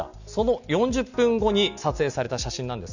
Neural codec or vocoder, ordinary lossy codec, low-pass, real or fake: none; none; 7.2 kHz; real